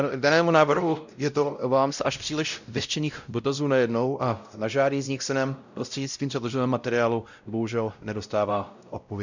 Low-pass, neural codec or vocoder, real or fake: 7.2 kHz; codec, 16 kHz, 0.5 kbps, X-Codec, WavLM features, trained on Multilingual LibriSpeech; fake